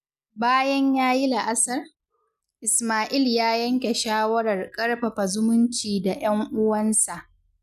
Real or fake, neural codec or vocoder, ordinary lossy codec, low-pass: real; none; none; 14.4 kHz